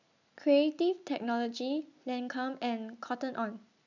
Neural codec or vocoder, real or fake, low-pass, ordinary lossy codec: none; real; 7.2 kHz; none